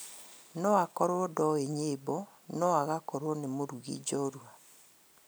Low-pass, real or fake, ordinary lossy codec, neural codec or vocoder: none; real; none; none